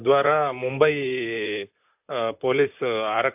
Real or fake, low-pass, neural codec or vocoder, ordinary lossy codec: fake; 3.6 kHz; vocoder, 44.1 kHz, 128 mel bands, Pupu-Vocoder; AAC, 32 kbps